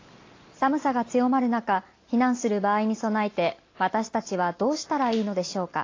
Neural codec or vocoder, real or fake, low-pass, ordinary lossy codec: none; real; 7.2 kHz; AAC, 32 kbps